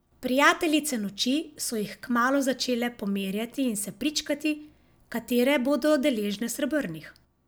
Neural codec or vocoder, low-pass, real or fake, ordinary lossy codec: none; none; real; none